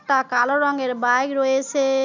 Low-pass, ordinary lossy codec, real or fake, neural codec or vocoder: 7.2 kHz; none; real; none